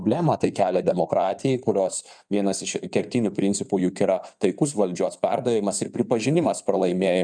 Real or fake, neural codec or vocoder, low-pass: fake; codec, 16 kHz in and 24 kHz out, 2.2 kbps, FireRedTTS-2 codec; 9.9 kHz